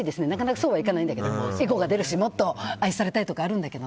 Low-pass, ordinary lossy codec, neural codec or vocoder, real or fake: none; none; none; real